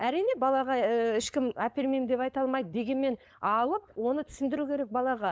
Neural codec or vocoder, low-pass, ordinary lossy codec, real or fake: codec, 16 kHz, 4.8 kbps, FACodec; none; none; fake